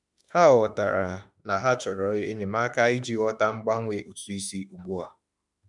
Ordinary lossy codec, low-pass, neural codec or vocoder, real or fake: none; 10.8 kHz; autoencoder, 48 kHz, 32 numbers a frame, DAC-VAE, trained on Japanese speech; fake